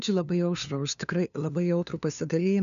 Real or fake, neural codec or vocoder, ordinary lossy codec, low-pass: fake; codec, 16 kHz, 2 kbps, FunCodec, trained on LibriTTS, 25 frames a second; MP3, 96 kbps; 7.2 kHz